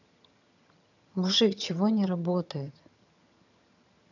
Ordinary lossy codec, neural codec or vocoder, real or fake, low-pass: none; vocoder, 22.05 kHz, 80 mel bands, HiFi-GAN; fake; 7.2 kHz